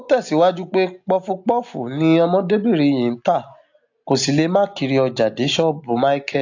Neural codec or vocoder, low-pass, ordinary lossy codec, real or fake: none; 7.2 kHz; AAC, 48 kbps; real